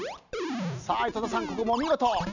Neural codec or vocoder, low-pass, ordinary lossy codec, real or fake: none; 7.2 kHz; none; real